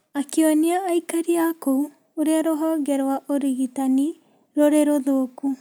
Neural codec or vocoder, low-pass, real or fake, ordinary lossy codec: none; none; real; none